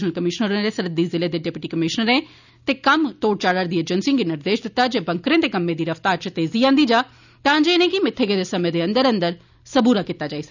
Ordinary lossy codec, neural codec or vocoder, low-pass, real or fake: none; none; 7.2 kHz; real